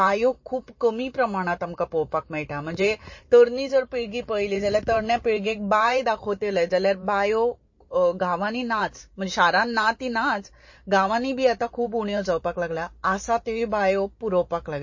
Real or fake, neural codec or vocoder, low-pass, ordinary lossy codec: fake; vocoder, 44.1 kHz, 128 mel bands every 512 samples, BigVGAN v2; 7.2 kHz; MP3, 32 kbps